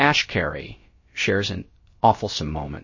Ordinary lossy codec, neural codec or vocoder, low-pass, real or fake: MP3, 32 kbps; codec, 16 kHz, about 1 kbps, DyCAST, with the encoder's durations; 7.2 kHz; fake